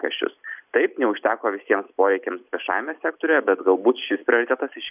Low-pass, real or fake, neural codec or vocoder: 3.6 kHz; real; none